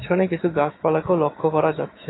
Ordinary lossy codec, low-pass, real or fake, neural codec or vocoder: AAC, 16 kbps; 7.2 kHz; fake; autoencoder, 48 kHz, 128 numbers a frame, DAC-VAE, trained on Japanese speech